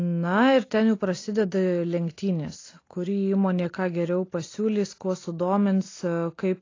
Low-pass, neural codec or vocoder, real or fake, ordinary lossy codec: 7.2 kHz; none; real; AAC, 32 kbps